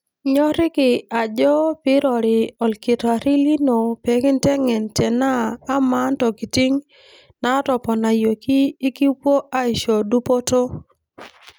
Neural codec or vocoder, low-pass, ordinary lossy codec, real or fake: none; none; none; real